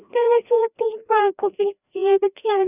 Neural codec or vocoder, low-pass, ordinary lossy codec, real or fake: codec, 16 kHz, 1 kbps, FreqCodec, larger model; 3.6 kHz; none; fake